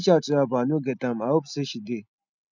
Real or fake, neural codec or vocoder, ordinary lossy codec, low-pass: real; none; none; 7.2 kHz